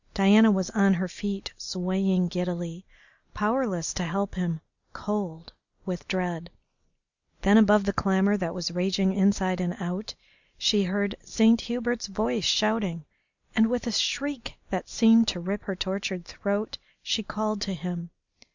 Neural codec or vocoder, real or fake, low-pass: none; real; 7.2 kHz